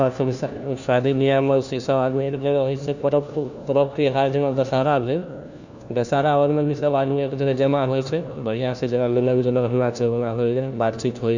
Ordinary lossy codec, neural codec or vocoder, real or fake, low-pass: none; codec, 16 kHz, 1 kbps, FunCodec, trained on LibriTTS, 50 frames a second; fake; 7.2 kHz